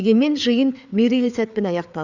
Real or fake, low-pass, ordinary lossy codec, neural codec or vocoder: fake; 7.2 kHz; none; codec, 16 kHz, 4 kbps, FreqCodec, larger model